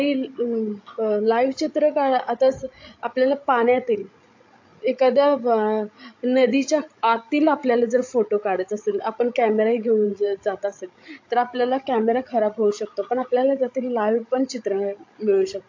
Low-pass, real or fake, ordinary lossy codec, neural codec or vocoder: 7.2 kHz; fake; AAC, 48 kbps; codec, 16 kHz, 16 kbps, FreqCodec, larger model